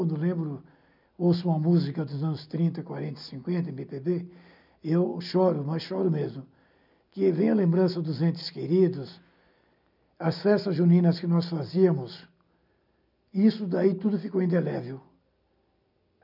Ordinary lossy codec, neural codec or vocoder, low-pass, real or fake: none; none; 5.4 kHz; real